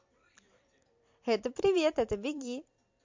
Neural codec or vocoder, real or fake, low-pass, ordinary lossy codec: vocoder, 44.1 kHz, 128 mel bands every 256 samples, BigVGAN v2; fake; 7.2 kHz; MP3, 48 kbps